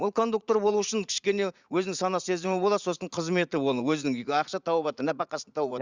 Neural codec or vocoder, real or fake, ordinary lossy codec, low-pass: vocoder, 44.1 kHz, 80 mel bands, Vocos; fake; Opus, 64 kbps; 7.2 kHz